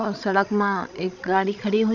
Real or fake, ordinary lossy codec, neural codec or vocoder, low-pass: fake; none; codec, 16 kHz, 16 kbps, FreqCodec, larger model; 7.2 kHz